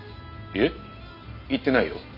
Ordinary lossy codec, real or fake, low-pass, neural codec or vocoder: none; real; 5.4 kHz; none